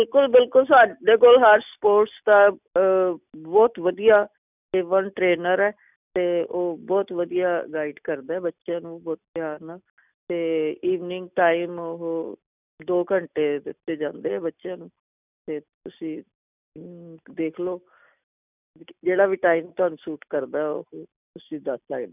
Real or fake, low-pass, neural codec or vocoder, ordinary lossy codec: real; 3.6 kHz; none; none